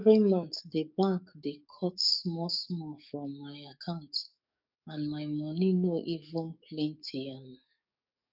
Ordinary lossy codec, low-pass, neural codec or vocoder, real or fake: none; 5.4 kHz; codec, 44.1 kHz, 7.8 kbps, DAC; fake